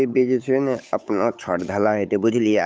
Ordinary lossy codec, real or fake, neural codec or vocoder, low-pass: none; fake; codec, 16 kHz, 8 kbps, FunCodec, trained on Chinese and English, 25 frames a second; none